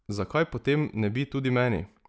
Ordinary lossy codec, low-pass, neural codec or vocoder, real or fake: none; none; none; real